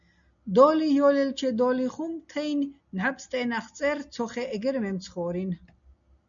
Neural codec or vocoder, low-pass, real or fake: none; 7.2 kHz; real